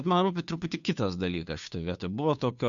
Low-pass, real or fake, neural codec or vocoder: 7.2 kHz; fake; codec, 16 kHz, 2 kbps, FunCodec, trained on Chinese and English, 25 frames a second